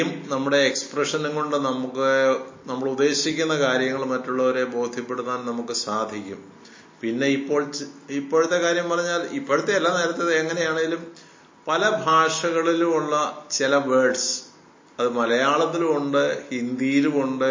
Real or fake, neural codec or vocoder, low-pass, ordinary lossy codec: real; none; 7.2 kHz; MP3, 32 kbps